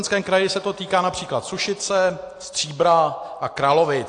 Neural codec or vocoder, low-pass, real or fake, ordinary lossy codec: none; 9.9 kHz; real; AAC, 48 kbps